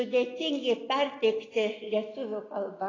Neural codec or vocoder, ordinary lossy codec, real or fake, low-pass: none; AAC, 32 kbps; real; 7.2 kHz